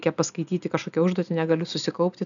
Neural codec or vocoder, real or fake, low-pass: none; real; 7.2 kHz